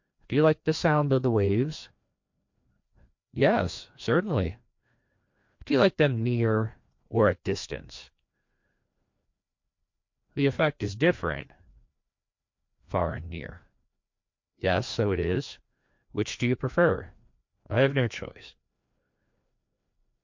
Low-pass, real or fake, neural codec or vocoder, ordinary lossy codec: 7.2 kHz; fake; codec, 16 kHz, 2 kbps, FreqCodec, larger model; MP3, 48 kbps